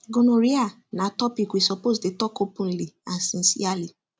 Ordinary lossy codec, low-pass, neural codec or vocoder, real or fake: none; none; none; real